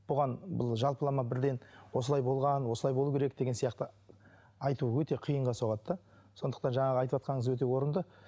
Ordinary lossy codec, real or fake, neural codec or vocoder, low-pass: none; real; none; none